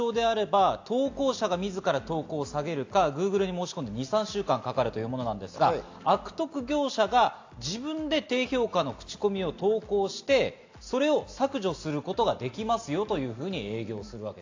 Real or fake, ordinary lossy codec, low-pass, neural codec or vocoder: real; AAC, 48 kbps; 7.2 kHz; none